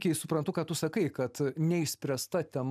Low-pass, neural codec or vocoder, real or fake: 14.4 kHz; none; real